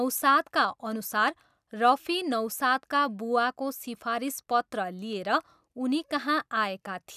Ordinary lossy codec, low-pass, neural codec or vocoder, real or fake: none; 14.4 kHz; none; real